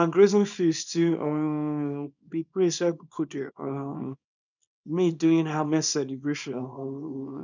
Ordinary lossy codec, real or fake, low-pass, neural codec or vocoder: none; fake; 7.2 kHz; codec, 24 kHz, 0.9 kbps, WavTokenizer, small release